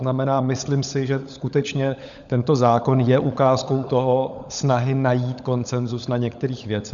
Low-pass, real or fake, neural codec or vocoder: 7.2 kHz; fake; codec, 16 kHz, 16 kbps, FunCodec, trained on LibriTTS, 50 frames a second